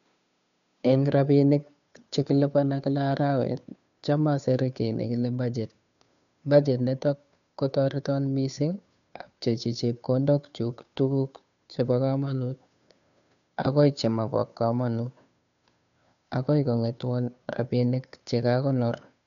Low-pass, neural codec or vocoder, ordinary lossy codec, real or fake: 7.2 kHz; codec, 16 kHz, 2 kbps, FunCodec, trained on Chinese and English, 25 frames a second; none; fake